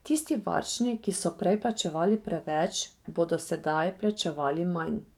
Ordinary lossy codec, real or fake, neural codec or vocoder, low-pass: none; fake; codec, 44.1 kHz, 7.8 kbps, DAC; 19.8 kHz